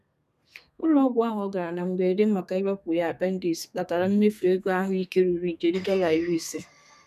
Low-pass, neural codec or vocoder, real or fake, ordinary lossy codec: 14.4 kHz; codec, 32 kHz, 1.9 kbps, SNAC; fake; none